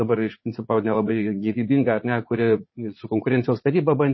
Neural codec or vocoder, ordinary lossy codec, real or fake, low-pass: vocoder, 44.1 kHz, 80 mel bands, Vocos; MP3, 24 kbps; fake; 7.2 kHz